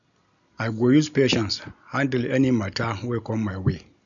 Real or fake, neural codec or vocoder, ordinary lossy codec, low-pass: real; none; none; 7.2 kHz